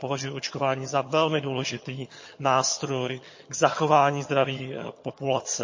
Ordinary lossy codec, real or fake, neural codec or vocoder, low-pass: MP3, 32 kbps; fake; vocoder, 22.05 kHz, 80 mel bands, HiFi-GAN; 7.2 kHz